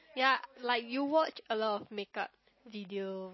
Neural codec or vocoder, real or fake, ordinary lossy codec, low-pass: none; real; MP3, 24 kbps; 7.2 kHz